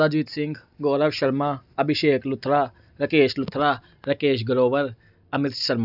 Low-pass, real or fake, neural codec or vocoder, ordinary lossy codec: 5.4 kHz; real; none; none